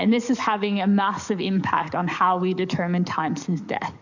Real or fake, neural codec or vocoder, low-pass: fake; codec, 44.1 kHz, 7.8 kbps, DAC; 7.2 kHz